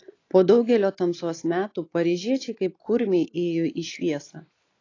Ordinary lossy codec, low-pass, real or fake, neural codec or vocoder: AAC, 32 kbps; 7.2 kHz; real; none